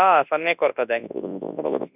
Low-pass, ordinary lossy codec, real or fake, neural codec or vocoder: 3.6 kHz; none; fake; codec, 24 kHz, 0.9 kbps, WavTokenizer, large speech release